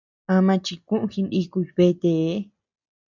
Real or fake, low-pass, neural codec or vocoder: real; 7.2 kHz; none